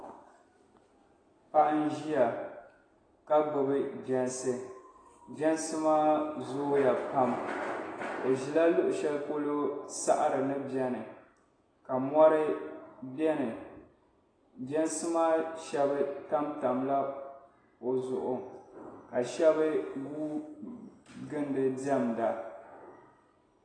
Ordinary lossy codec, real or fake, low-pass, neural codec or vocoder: AAC, 32 kbps; real; 9.9 kHz; none